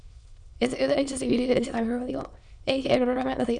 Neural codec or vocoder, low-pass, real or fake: autoencoder, 22.05 kHz, a latent of 192 numbers a frame, VITS, trained on many speakers; 9.9 kHz; fake